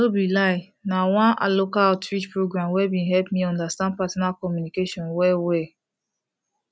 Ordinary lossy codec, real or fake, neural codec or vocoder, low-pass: none; real; none; none